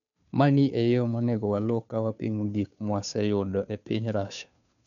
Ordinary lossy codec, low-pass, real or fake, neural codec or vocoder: none; 7.2 kHz; fake; codec, 16 kHz, 2 kbps, FunCodec, trained on Chinese and English, 25 frames a second